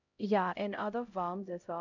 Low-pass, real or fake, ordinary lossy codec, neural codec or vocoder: 7.2 kHz; fake; none; codec, 16 kHz, 0.5 kbps, X-Codec, HuBERT features, trained on LibriSpeech